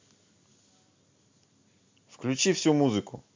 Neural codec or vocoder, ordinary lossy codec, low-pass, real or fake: none; MP3, 64 kbps; 7.2 kHz; real